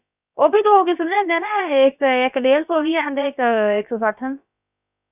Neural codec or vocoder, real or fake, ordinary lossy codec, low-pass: codec, 16 kHz, about 1 kbps, DyCAST, with the encoder's durations; fake; none; 3.6 kHz